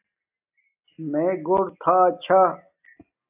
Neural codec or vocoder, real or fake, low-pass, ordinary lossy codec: none; real; 3.6 kHz; AAC, 32 kbps